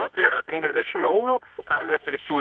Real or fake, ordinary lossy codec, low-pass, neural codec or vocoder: fake; MP3, 64 kbps; 9.9 kHz; codec, 24 kHz, 0.9 kbps, WavTokenizer, medium music audio release